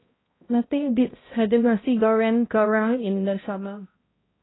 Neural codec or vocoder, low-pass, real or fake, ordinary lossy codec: codec, 16 kHz, 0.5 kbps, X-Codec, HuBERT features, trained on balanced general audio; 7.2 kHz; fake; AAC, 16 kbps